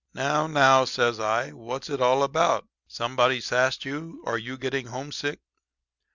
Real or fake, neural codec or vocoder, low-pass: real; none; 7.2 kHz